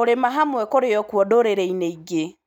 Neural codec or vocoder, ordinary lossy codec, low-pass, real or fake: none; none; 19.8 kHz; real